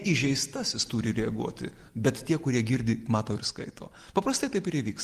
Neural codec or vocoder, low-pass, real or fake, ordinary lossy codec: none; 14.4 kHz; real; Opus, 24 kbps